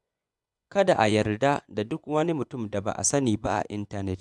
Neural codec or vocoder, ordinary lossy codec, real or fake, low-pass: vocoder, 24 kHz, 100 mel bands, Vocos; none; fake; none